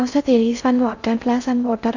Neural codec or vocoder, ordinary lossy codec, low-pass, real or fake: codec, 16 kHz in and 24 kHz out, 0.6 kbps, FocalCodec, streaming, 2048 codes; none; 7.2 kHz; fake